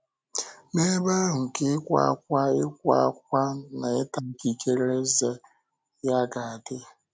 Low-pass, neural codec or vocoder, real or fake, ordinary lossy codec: none; none; real; none